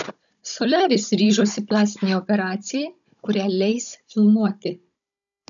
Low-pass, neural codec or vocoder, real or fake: 7.2 kHz; codec, 16 kHz, 16 kbps, FunCodec, trained on Chinese and English, 50 frames a second; fake